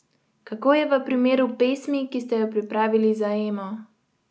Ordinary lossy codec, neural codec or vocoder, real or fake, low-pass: none; none; real; none